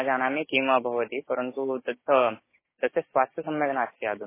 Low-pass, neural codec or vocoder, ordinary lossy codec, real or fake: 3.6 kHz; codec, 16 kHz, 6 kbps, DAC; MP3, 16 kbps; fake